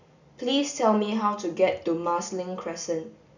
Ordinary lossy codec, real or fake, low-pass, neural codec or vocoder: none; real; 7.2 kHz; none